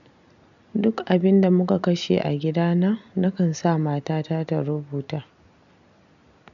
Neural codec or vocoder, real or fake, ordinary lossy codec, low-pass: none; real; none; 7.2 kHz